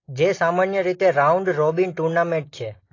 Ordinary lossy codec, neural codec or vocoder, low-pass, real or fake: AAC, 32 kbps; none; 7.2 kHz; real